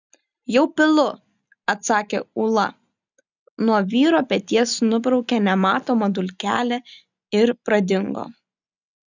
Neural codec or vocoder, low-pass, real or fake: none; 7.2 kHz; real